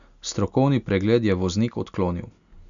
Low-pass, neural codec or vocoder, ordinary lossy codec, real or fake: 7.2 kHz; none; none; real